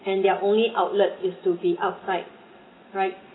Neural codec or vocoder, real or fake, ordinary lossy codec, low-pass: none; real; AAC, 16 kbps; 7.2 kHz